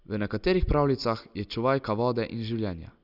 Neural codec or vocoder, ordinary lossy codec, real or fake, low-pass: none; none; real; 5.4 kHz